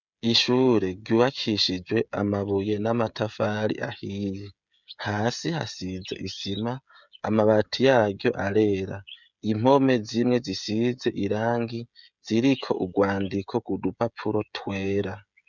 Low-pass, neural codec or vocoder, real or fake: 7.2 kHz; codec, 16 kHz, 16 kbps, FreqCodec, smaller model; fake